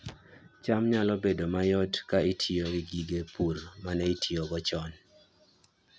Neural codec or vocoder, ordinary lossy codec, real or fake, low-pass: none; none; real; none